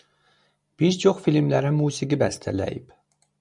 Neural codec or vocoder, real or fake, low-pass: none; real; 10.8 kHz